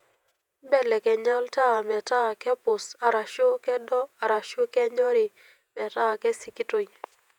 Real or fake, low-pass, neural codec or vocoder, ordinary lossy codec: fake; 19.8 kHz; vocoder, 48 kHz, 128 mel bands, Vocos; none